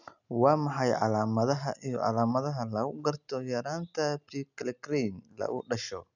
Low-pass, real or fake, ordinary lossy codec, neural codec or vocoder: 7.2 kHz; real; none; none